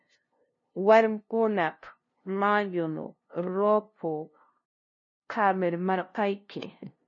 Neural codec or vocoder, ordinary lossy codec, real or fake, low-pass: codec, 16 kHz, 0.5 kbps, FunCodec, trained on LibriTTS, 25 frames a second; MP3, 32 kbps; fake; 7.2 kHz